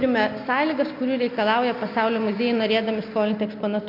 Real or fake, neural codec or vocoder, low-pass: real; none; 5.4 kHz